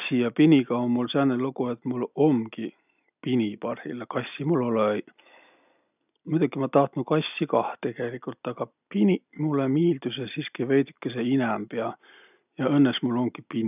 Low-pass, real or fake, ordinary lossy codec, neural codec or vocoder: 3.6 kHz; real; none; none